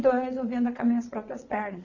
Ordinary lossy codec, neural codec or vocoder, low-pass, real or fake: none; vocoder, 22.05 kHz, 80 mel bands, WaveNeXt; 7.2 kHz; fake